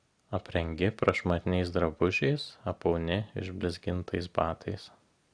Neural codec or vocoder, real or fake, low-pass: none; real; 9.9 kHz